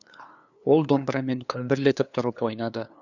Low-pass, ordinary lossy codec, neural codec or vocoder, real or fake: 7.2 kHz; none; codec, 16 kHz, 2 kbps, FunCodec, trained on LibriTTS, 25 frames a second; fake